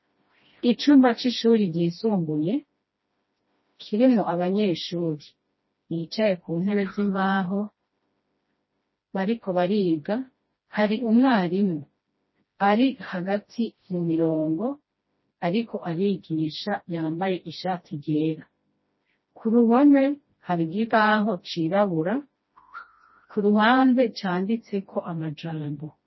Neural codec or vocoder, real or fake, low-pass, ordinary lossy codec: codec, 16 kHz, 1 kbps, FreqCodec, smaller model; fake; 7.2 kHz; MP3, 24 kbps